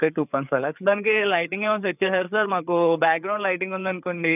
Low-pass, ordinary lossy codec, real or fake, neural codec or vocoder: 3.6 kHz; none; fake; codec, 16 kHz, 4 kbps, FreqCodec, larger model